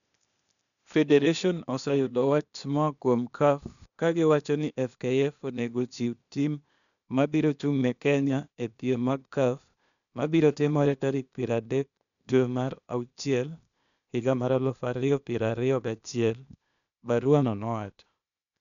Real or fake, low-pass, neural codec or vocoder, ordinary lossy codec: fake; 7.2 kHz; codec, 16 kHz, 0.8 kbps, ZipCodec; none